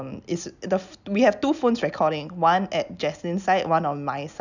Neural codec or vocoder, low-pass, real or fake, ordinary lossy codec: none; 7.2 kHz; real; none